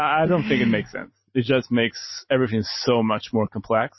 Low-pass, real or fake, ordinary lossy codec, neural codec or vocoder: 7.2 kHz; real; MP3, 24 kbps; none